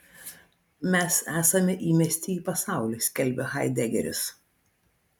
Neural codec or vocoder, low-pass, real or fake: none; 19.8 kHz; real